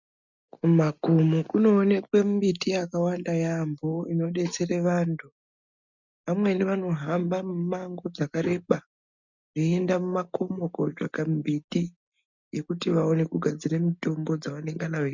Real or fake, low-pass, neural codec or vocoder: real; 7.2 kHz; none